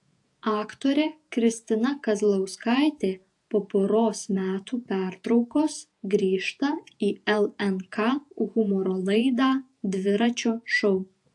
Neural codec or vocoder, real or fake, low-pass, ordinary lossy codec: vocoder, 48 kHz, 128 mel bands, Vocos; fake; 10.8 kHz; AAC, 64 kbps